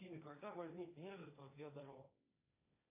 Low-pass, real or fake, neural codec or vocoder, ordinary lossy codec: 3.6 kHz; fake; codec, 16 kHz, 1.1 kbps, Voila-Tokenizer; AAC, 32 kbps